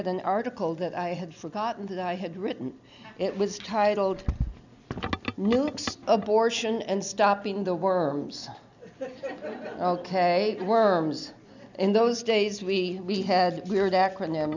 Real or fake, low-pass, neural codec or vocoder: real; 7.2 kHz; none